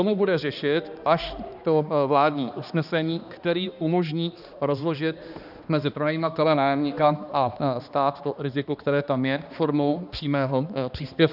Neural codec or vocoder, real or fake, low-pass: codec, 16 kHz, 2 kbps, X-Codec, HuBERT features, trained on balanced general audio; fake; 5.4 kHz